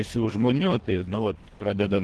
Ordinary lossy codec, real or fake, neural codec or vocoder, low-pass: Opus, 16 kbps; fake; codec, 24 kHz, 1.5 kbps, HILCodec; 10.8 kHz